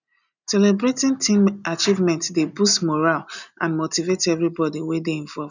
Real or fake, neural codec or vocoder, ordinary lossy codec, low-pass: real; none; none; 7.2 kHz